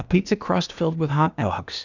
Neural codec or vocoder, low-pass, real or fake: codec, 16 kHz, 0.8 kbps, ZipCodec; 7.2 kHz; fake